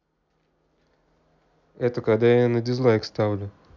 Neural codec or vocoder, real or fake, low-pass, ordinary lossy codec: none; real; 7.2 kHz; none